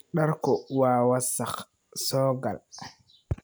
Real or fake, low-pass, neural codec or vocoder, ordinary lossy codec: real; none; none; none